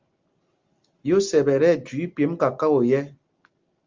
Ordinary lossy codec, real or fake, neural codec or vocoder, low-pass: Opus, 32 kbps; real; none; 7.2 kHz